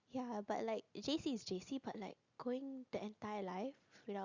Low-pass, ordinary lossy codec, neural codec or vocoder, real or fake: 7.2 kHz; Opus, 64 kbps; none; real